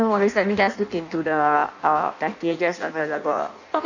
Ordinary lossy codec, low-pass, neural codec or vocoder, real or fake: none; 7.2 kHz; codec, 16 kHz in and 24 kHz out, 0.6 kbps, FireRedTTS-2 codec; fake